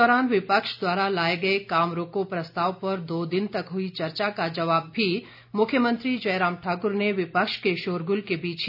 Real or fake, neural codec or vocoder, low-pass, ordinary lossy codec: real; none; 5.4 kHz; none